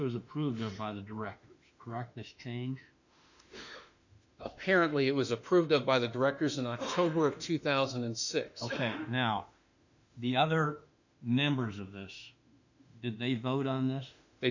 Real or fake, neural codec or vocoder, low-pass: fake; autoencoder, 48 kHz, 32 numbers a frame, DAC-VAE, trained on Japanese speech; 7.2 kHz